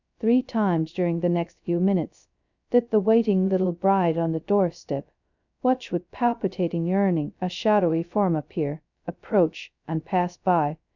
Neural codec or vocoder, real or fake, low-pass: codec, 16 kHz, 0.2 kbps, FocalCodec; fake; 7.2 kHz